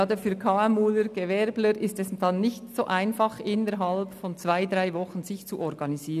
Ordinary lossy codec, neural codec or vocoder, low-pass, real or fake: none; none; 14.4 kHz; real